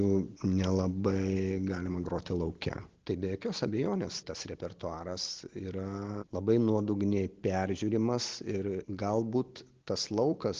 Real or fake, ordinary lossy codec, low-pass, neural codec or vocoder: real; Opus, 16 kbps; 7.2 kHz; none